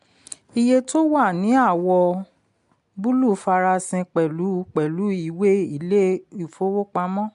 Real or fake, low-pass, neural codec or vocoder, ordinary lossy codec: real; 10.8 kHz; none; MP3, 64 kbps